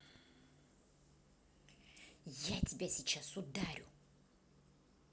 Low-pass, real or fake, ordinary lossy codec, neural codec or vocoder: none; real; none; none